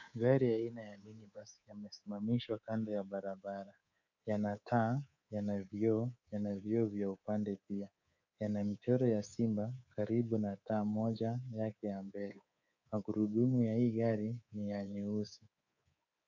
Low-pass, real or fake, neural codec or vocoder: 7.2 kHz; fake; codec, 24 kHz, 3.1 kbps, DualCodec